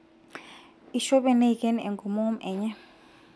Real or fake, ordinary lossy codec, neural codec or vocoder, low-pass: real; none; none; none